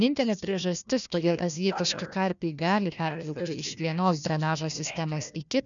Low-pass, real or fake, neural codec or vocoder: 7.2 kHz; fake; codec, 16 kHz, 1 kbps, FunCodec, trained on Chinese and English, 50 frames a second